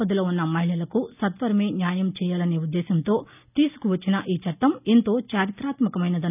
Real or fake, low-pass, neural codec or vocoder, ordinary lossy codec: real; 3.6 kHz; none; none